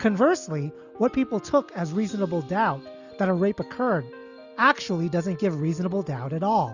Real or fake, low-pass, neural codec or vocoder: real; 7.2 kHz; none